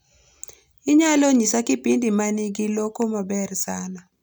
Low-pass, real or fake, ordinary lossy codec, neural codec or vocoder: none; real; none; none